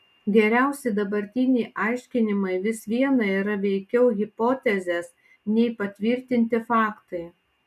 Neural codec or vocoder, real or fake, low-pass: none; real; 14.4 kHz